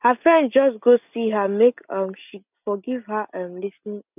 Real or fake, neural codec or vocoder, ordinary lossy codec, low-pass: real; none; none; 3.6 kHz